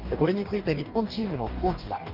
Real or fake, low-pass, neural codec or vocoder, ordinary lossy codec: fake; 5.4 kHz; codec, 44.1 kHz, 2.6 kbps, DAC; Opus, 32 kbps